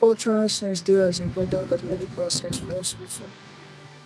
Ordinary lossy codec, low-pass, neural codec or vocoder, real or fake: none; none; codec, 24 kHz, 0.9 kbps, WavTokenizer, medium music audio release; fake